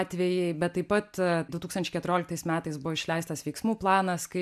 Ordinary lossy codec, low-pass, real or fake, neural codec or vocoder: AAC, 96 kbps; 14.4 kHz; real; none